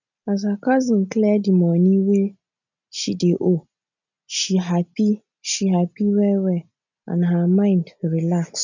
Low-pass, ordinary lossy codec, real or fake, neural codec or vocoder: 7.2 kHz; none; real; none